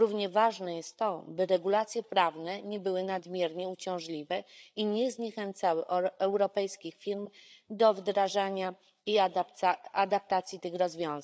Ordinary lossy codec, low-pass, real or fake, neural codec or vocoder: none; none; fake; codec, 16 kHz, 8 kbps, FreqCodec, larger model